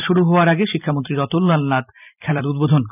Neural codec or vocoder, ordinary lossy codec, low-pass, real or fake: vocoder, 44.1 kHz, 128 mel bands every 256 samples, BigVGAN v2; none; 3.6 kHz; fake